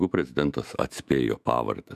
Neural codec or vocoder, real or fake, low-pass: autoencoder, 48 kHz, 128 numbers a frame, DAC-VAE, trained on Japanese speech; fake; 14.4 kHz